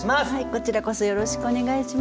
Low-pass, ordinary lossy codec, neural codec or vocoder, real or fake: none; none; none; real